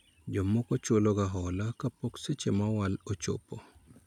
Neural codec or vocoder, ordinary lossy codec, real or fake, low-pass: none; none; real; 19.8 kHz